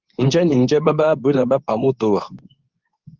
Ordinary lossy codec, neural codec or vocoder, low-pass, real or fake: Opus, 24 kbps; codec, 24 kHz, 0.9 kbps, WavTokenizer, medium speech release version 2; 7.2 kHz; fake